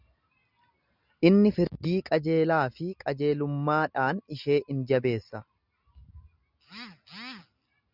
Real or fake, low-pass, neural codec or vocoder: real; 5.4 kHz; none